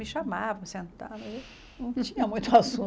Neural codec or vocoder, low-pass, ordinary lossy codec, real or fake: none; none; none; real